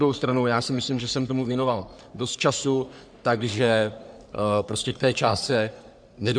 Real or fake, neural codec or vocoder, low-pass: fake; codec, 44.1 kHz, 3.4 kbps, Pupu-Codec; 9.9 kHz